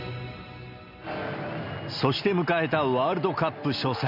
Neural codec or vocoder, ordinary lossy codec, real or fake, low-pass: none; none; real; 5.4 kHz